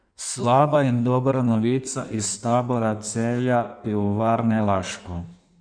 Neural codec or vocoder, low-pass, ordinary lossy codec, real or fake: codec, 16 kHz in and 24 kHz out, 1.1 kbps, FireRedTTS-2 codec; 9.9 kHz; none; fake